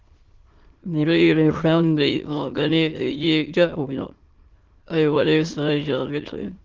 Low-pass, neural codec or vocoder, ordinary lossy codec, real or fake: 7.2 kHz; autoencoder, 22.05 kHz, a latent of 192 numbers a frame, VITS, trained on many speakers; Opus, 16 kbps; fake